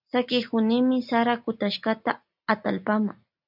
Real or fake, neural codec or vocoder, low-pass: real; none; 5.4 kHz